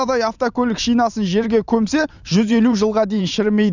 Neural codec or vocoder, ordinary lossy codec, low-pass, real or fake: vocoder, 44.1 kHz, 128 mel bands every 512 samples, BigVGAN v2; none; 7.2 kHz; fake